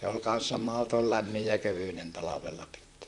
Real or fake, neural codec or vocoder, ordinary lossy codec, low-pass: fake; vocoder, 44.1 kHz, 128 mel bands, Pupu-Vocoder; AAC, 64 kbps; 10.8 kHz